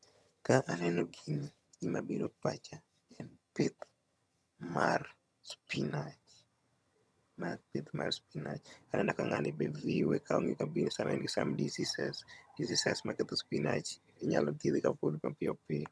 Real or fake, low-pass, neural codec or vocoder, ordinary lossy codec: fake; none; vocoder, 22.05 kHz, 80 mel bands, HiFi-GAN; none